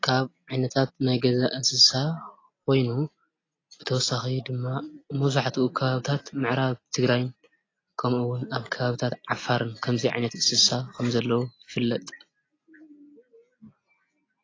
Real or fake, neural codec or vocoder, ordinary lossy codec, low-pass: real; none; AAC, 32 kbps; 7.2 kHz